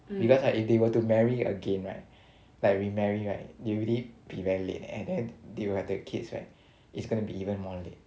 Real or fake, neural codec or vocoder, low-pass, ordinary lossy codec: real; none; none; none